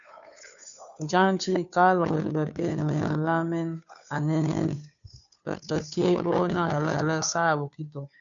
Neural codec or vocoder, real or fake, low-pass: codec, 16 kHz, 2 kbps, FunCodec, trained on Chinese and English, 25 frames a second; fake; 7.2 kHz